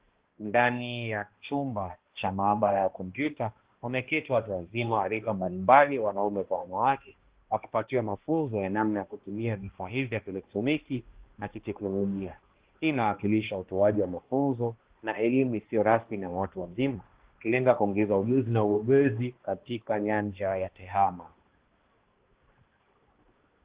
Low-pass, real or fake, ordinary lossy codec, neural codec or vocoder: 3.6 kHz; fake; Opus, 16 kbps; codec, 16 kHz, 1 kbps, X-Codec, HuBERT features, trained on balanced general audio